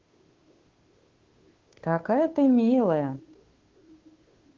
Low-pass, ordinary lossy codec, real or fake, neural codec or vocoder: 7.2 kHz; Opus, 16 kbps; fake; codec, 16 kHz, 2 kbps, FunCodec, trained on Chinese and English, 25 frames a second